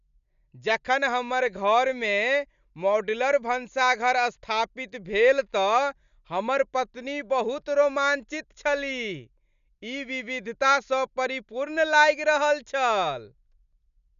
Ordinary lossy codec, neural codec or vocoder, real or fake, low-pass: none; none; real; 7.2 kHz